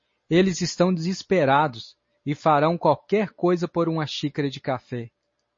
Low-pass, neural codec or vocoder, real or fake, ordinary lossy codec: 7.2 kHz; none; real; MP3, 32 kbps